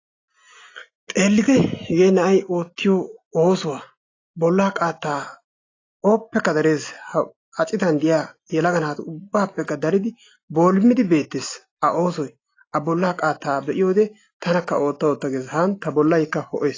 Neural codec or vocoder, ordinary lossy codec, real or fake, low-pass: none; AAC, 32 kbps; real; 7.2 kHz